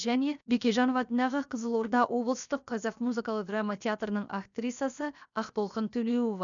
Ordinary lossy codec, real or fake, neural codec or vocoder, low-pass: none; fake; codec, 16 kHz, 0.7 kbps, FocalCodec; 7.2 kHz